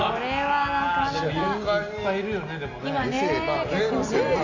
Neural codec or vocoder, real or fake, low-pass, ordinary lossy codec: none; real; 7.2 kHz; Opus, 64 kbps